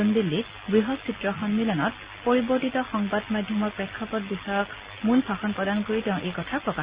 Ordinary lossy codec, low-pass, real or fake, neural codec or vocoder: Opus, 64 kbps; 3.6 kHz; real; none